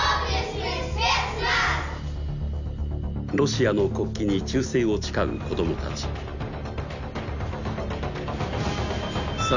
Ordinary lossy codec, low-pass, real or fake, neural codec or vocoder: none; 7.2 kHz; real; none